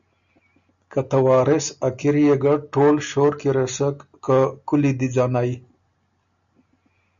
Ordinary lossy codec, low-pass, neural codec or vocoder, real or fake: MP3, 96 kbps; 7.2 kHz; none; real